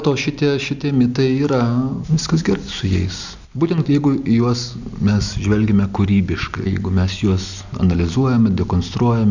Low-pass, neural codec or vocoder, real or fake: 7.2 kHz; none; real